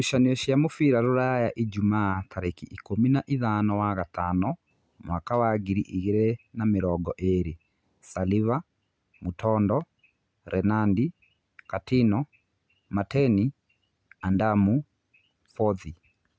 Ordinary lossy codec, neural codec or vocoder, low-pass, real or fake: none; none; none; real